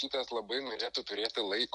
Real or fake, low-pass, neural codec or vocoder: real; 10.8 kHz; none